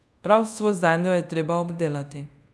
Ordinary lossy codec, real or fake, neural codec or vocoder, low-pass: none; fake; codec, 24 kHz, 0.5 kbps, DualCodec; none